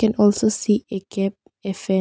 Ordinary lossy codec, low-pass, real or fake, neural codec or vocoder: none; none; real; none